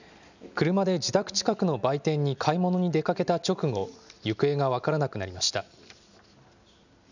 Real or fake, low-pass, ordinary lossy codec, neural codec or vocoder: real; 7.2 kHz; none; none